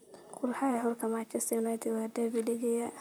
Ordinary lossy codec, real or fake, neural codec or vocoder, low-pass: none; fake; vocoder, 44.1 kHz, 128 mel bands, Pupu-Vocoder; none